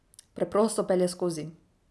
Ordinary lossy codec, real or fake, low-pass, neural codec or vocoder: none; real; none; none